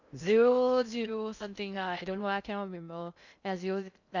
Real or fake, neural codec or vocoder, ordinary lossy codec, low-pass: fake; codec, 16 kHz in and 24 kHz out, 0.6 kbps, FocalCodec, streaming, 2048 codes; none; 7.2 kHz